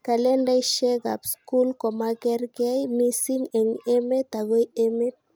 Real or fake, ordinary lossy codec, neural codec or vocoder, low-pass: real; none; none; none